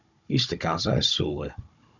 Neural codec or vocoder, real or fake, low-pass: codec, 16 kHz, 16 kbps, FunCodec, trained on Chinese and English, 50 frames a second; fake; 7.2 kHz